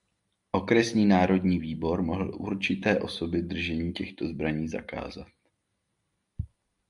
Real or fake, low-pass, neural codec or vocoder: real; 10.8 kHz; none